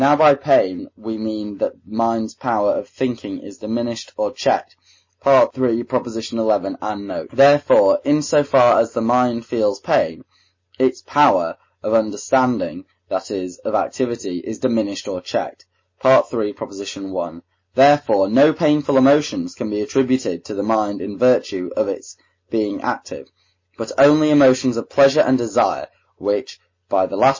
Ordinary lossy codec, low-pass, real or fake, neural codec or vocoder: MP3, 32 kbps; 7.2 kHz; real; none